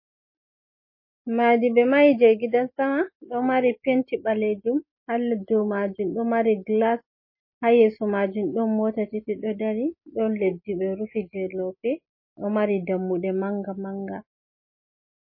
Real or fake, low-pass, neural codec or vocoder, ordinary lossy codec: real; 5.4 kHz; none; MP3, 24 kbps